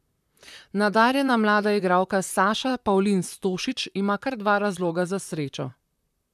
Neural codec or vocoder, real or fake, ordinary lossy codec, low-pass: vocoder, 44.1 kHz, 128 mel bands, Pupu-Vocoder; fake; none; 14.4 kHz